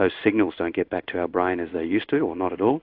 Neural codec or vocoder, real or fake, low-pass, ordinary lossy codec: codec, 16 kHz in and 24 kHz out, 1 kbps, XY-Tokenizer; fake; 5.4 kHz; AAC, 48 kbps